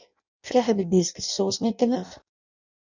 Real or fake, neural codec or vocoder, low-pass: fake; codec, 16 kHz in and 24 kHz out, 0.6 kbps, FireRedTTS-2 codec; 7.2 kHz